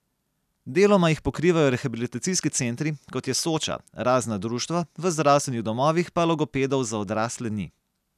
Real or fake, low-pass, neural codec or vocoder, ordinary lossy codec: real; 14.4 kHz; none; none